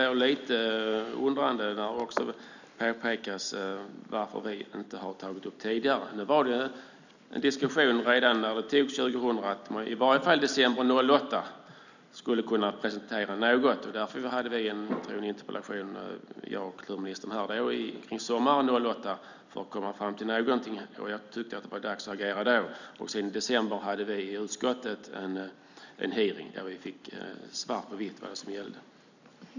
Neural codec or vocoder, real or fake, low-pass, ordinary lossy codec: none; real; 7.2 kHz; none